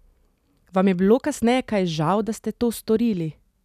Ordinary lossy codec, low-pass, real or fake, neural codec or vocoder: none; 14.4 kHz; real; none